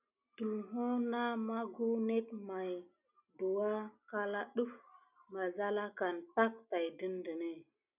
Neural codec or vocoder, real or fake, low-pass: none; real; 3.6 kHz